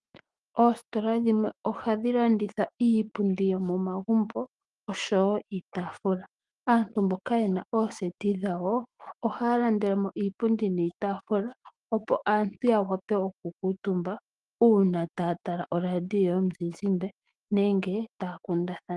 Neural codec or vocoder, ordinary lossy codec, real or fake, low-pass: autoencoder, 48 kHz, 128 numbers a frame, DAC-VAE, trained on Japanese speech; Opus, 24 kbps; fake; 10.8 kHz